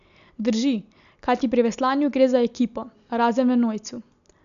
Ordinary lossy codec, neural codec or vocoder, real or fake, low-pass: none; none; real; 7.2 kHz